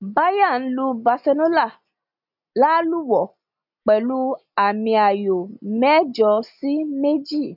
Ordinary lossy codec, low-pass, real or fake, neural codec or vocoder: none; 5.4 kHz; real; none